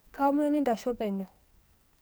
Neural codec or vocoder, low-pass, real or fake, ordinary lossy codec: codec, 44.1 kHz, 2.6 kbps, SNAC; none; fake; none